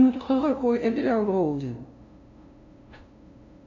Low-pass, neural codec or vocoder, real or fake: 7.2 kHz; codec, 16 kHz, 0.5 kbps, FunCodec, trained on LibriTTS, 25 frames a second; fake